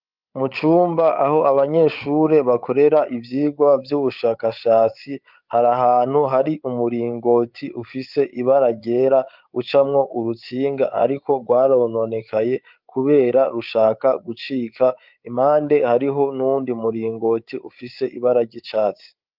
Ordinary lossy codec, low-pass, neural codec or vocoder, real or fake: Opus, 32 kbps; 5.4 kHz; codec, 16 kHz, 8 kbps, FreqCodec, larger model; fake